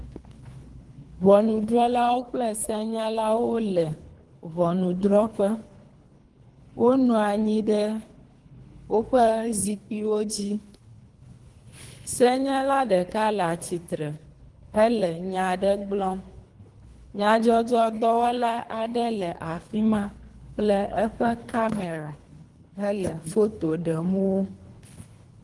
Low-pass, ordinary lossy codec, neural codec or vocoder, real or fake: 10.8 kHz; Opus, 24 kbps; codec, 24 kHz, 3 kbps, HILCodec; fake